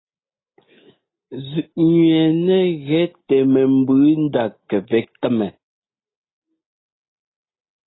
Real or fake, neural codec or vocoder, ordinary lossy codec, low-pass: real; none; AAC, 16 kbps; 7.2 kHz